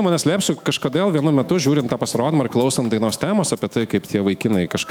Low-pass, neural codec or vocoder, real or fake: 19.8 kHz; autoencoder, 48 kHz, 128 numbers a frame, DAC-VAE, trained on Japanese speech; fake